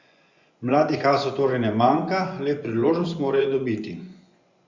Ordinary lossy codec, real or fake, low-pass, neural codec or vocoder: Opus, 64 kbps; fake; 7.2 kHz; vocoder, 24 kHz, 100 mel bands, Vocos